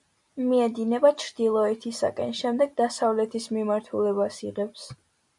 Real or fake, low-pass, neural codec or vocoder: real; 10.8 kHz; none